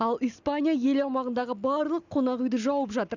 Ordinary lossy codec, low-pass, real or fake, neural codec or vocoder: none; 7.2 kHz; real; none